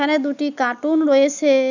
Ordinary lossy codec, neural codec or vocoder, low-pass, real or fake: none; none; 7.2 kHz; real